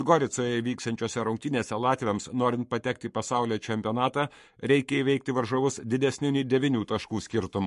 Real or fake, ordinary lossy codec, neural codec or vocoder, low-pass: fake; MP3, 48 kbps; codec, 44.1 kHz, 7.8 kbps, Pupu-Codec; 14.4 kHz